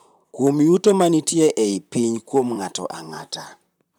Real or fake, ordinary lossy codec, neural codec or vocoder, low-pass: fake; none; vocoder, 44.1 kHz, 128 mel bands, Pupu-Vocoder; none